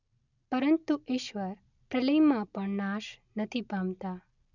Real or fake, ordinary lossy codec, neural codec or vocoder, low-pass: real; none; none; 7.2 kHz